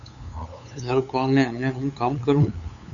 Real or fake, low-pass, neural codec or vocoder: fake; 7.2 kHz; codec, 16 kHz, 8 kbps, FunCodec, trained on LibriTTS, 25 frames a second